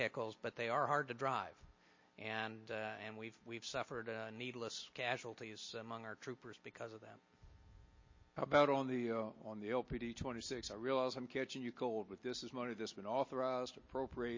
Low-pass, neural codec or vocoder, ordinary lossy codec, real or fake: 7.2 kHz; none; MP3, 32 kbps; real